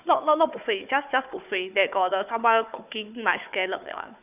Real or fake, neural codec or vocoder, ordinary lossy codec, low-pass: fake; codec, 16 kHz, 4 kbps, FunCodec, trained on Chinese and English, 50 frames a second; none; 3.6 kHz